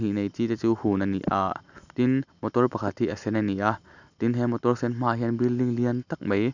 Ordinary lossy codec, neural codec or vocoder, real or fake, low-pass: none; none; real; 7.2 kHz